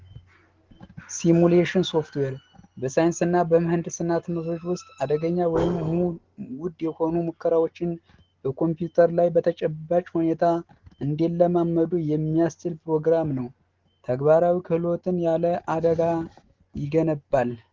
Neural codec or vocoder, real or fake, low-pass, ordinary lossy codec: none; real; 7.2 kHz; Opus, 16 kbps